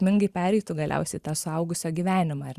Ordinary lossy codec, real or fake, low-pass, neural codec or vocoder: Opus, 64 kbps; fake; 14.4 kHz; vocoder, 44.1 kHz, 128 mel bands every 512 samples, BigVGAN v2